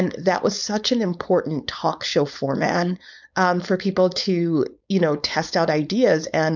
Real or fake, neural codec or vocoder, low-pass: fake; codec, 16 kHz, 4.8 kbps, FACodec; 7.2 kHz